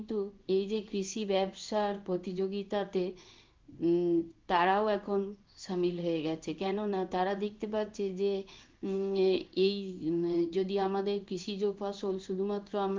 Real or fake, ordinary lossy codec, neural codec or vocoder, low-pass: fake; Opus, 32 kbps; codec, 16 kHz in and 24 kHz out, 1 kbps, XY-Tokenizer; 7.2 kHz